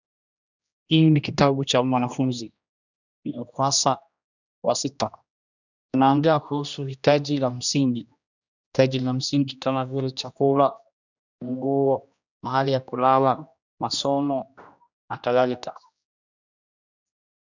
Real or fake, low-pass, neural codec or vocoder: fake; 7.2 kHz; codec, 16 kHz, 1 kbps, X-Codec, HuBERT features, trained on general audio